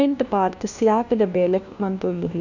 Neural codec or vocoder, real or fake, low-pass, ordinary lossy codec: codec, 16 kHz, 1 kbps, FunCodec, trained on LibriTTS, 50 frames a second; fake; 7.2 kHz; none